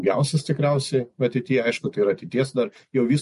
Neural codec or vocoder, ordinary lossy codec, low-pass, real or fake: none; MP3, 48 kbps; 14.4 kHz; real